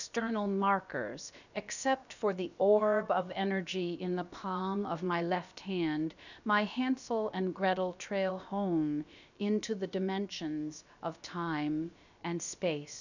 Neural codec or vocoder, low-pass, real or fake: codec, 16 kHz, about 1 kbps, DyCAST, with the encoder's durations; 7.2 kHz; fake